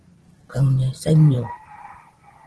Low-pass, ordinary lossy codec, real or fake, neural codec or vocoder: 10.8 kHz; Opus, 16 kbps; fake; vocoder, 44.1 kHz, 128 mel bands every 512 samples, BigVGAN v2